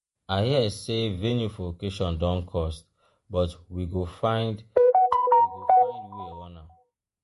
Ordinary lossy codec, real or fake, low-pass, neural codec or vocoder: MP3, 48 kbps; fake; 14.4 kHz; vocoder, 48 kHz, 128 mel bands, Vocos